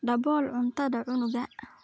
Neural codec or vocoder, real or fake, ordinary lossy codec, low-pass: none; real; none; none